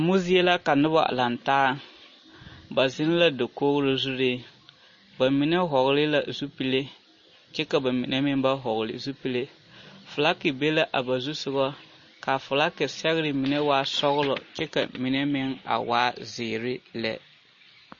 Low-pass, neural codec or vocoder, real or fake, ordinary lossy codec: 7.2 kHz; none; real; MP3, 32 kbps